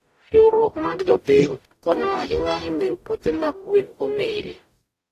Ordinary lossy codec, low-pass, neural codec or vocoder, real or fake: AAC, 64 kbps; 14.4 kHz; codec, 44.1 kHz, 0.9 kbps, DAC; fake